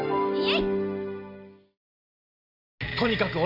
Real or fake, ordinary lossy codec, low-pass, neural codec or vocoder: real; none; 5.4 kHz; none